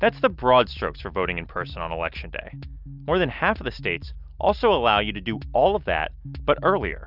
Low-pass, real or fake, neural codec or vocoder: 5.4 kHz; real; none